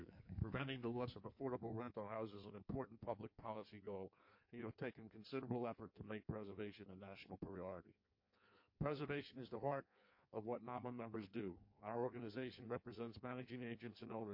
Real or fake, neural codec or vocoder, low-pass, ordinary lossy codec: fake; codec, 16 kHz in and 24 kHz out, 1.1 kbps, FireRedTTS-2 codec; 5.4 kHz; MP3, 32 kbps